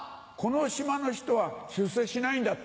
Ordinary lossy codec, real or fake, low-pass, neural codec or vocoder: none; real; none; none